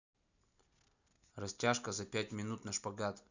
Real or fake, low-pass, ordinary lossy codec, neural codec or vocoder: real; 7.2 kHz; none; none